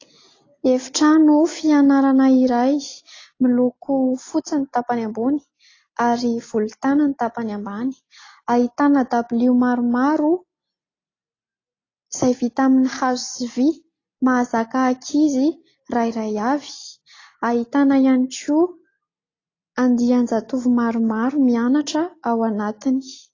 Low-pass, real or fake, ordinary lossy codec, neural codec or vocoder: 7.2 kHz; real; AAC, 32 kbps; none